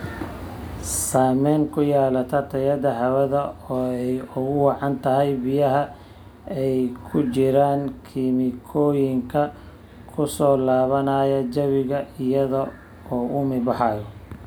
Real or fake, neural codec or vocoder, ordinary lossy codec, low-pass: real; none; none; none